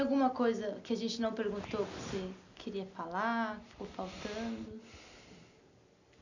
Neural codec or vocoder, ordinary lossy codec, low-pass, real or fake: none; none; 7.2 kHz; real